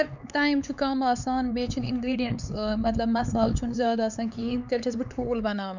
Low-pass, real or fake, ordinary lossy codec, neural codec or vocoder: 7.2 kHz; fake; none; codec, 16 kHz, 4 kbps, X-Codec, HuBERT features, trained on LibriSpeech